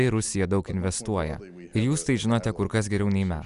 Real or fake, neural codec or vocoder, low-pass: real; none; 10.8 kHz